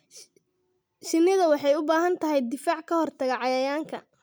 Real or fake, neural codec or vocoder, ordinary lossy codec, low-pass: real; none; none; none